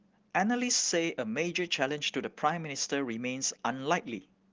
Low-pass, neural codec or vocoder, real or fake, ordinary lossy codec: 7.2 kHz; none; real; Opus, 16 kbps